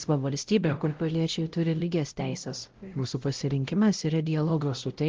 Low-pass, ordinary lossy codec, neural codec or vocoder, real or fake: 7.2 kHz; Opus, 16 kbps; codec, 16 kHz, 0.5 kbps, X-Codec, WavLM features, trained on Multilingual LibriSpeech; fake